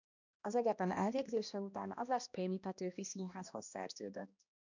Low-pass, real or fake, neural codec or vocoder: 7.2 kHz; fake; codec, 16 kHz, 1 kbps, X-Codec, HuBERT features, trained on balanced general audio